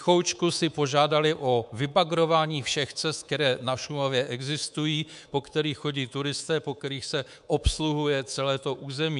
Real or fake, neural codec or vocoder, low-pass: fake; codec, 24 kHz, 3.1 kbps, DualCodec; 10.8 kHz